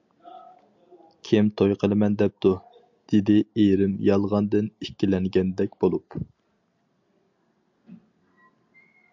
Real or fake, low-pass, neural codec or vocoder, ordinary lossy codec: real; 7.2 kHz; none; MP3, 64 kbps